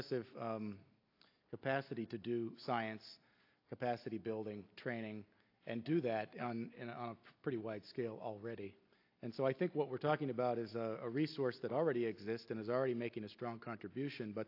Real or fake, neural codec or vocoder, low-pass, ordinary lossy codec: real; none; 5.4 kHz; AAC, 32 kbps